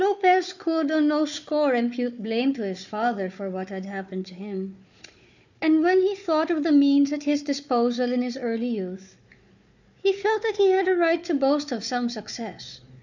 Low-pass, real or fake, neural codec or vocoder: 7.2 kHz; fake; codec, 16 kHz, 4 kbps, FunCodec, trained on Chinese and English, 50 frames a second